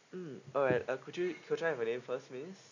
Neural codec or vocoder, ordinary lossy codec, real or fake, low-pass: none; none; real; 7.2 kHz